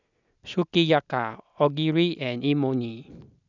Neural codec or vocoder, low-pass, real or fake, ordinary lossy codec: none; 7.2 kHz; real; none